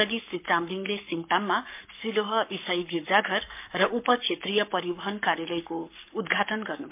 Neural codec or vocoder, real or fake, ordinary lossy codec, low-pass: codec, 44.1 kHz, 7.8 kbps, Pupu-Codec; fake; MP3, 32 kbps; 3.6 kHz